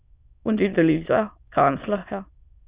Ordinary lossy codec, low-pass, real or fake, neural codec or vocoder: Opus, 64 kbps; 3.6 kHz; fake; autoencoder, 22.05 kHz, a latent of 192 numbers a frame, VITS, trained on many speakers